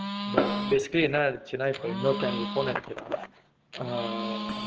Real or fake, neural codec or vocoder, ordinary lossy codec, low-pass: fake; codec, 16 kHz, 6 kbps, DAC; Opus, 16 kbps; 7.2 kHz